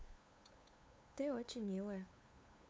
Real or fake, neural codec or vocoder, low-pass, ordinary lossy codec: fake; codec, 16 kHz, 8 kbps, FunCodec, trained on LibriTTS, 25 frames a second; none; none